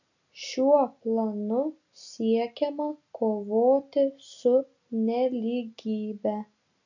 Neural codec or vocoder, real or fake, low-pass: none; real; 7.2 kHz